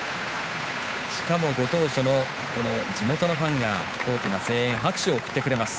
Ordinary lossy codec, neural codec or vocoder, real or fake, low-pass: none; codec, 16 kHz, 8 kbps, FunCodec, trained on Chinese and English, 25 frames a second; fake; none